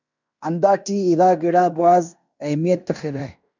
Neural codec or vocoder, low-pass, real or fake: codec, 16 kHz in and 24 kHz out, 0.9 kbps, LongCat-Audio-Codec, fine tuned four codebook decoder; 7.2 kHz; fake